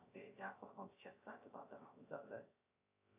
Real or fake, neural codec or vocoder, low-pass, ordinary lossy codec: fake; codec, 16 kHz, 0.3 kbps, FocalCodec; 3.6 kHz; AAC, 32 kbps